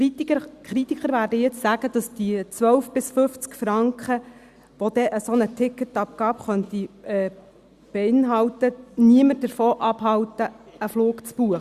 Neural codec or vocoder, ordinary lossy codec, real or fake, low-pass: none; Opus, 64 kbps; real; 14.4 kHz